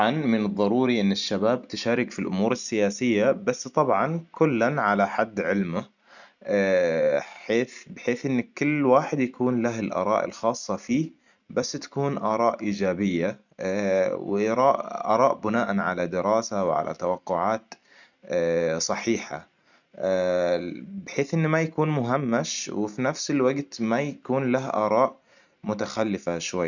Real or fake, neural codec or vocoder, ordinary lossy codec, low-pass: real; none; none; 7.2 kHz